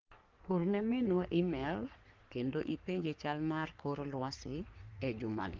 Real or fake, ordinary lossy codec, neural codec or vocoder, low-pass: fake; Opus, 32 kbps; codec, 44.1 kHz, 3.4 kbps, Pupu-Codec; 7.2 kHz